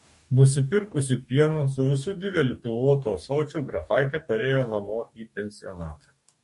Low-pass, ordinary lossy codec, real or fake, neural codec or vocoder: 14.4 kHz; MP3, 48 kbps; fake; codec, 44.1 kHz, 2.6 kbps, DAC